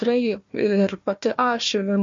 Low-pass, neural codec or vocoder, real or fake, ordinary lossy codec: 7.2 kHz; codec, 16 kHz, 1 kbps, FunCodec, trained on Chinese and English, 50 frames a second; fake; MP3, 48 kbps